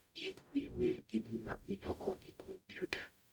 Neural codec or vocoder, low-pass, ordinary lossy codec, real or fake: codec, 44.1 kHz, 0.9 kbps, DAC; none; none; fake